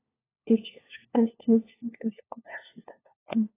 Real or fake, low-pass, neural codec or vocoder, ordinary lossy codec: fake; 3.6 kHz; codec, 16 kHz, 1 kbps, FunCodec, trained on LibriTTS, 50 frames a second; AAC, 16 kbps